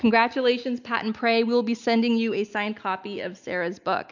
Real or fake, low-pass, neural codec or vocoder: real; 7.2 kHz; none